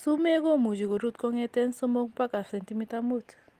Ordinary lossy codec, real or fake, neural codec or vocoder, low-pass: Opus, 24 kbps; real; none; 14.4 kHz